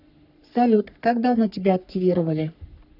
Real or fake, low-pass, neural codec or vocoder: fake; 5.4 kHz; codec, 44.1 kHz, 3.4 kbps, Pupu-Codec